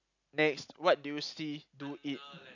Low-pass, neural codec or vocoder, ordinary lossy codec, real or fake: 7.2 kHz; none; none; real